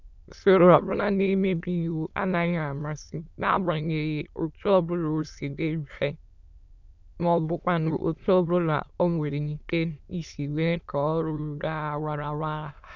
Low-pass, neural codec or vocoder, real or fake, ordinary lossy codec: 7.2 kHz; autoencoder, 22.05 kHz, a latent of 192 numbers a frame, VITS, trained on many speakers; fake; none